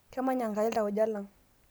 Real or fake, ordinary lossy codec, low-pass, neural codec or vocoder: real; none; none; none